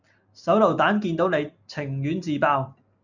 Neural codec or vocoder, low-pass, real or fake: none; 7.2 kHz; real